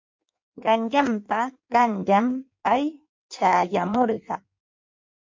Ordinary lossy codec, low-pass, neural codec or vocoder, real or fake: MP3, 48 kbps; 7.2 kHz; codec, 16 kHz in and 24 kHz out, 1.1 kbps, FireRedTTS-2 codec; fake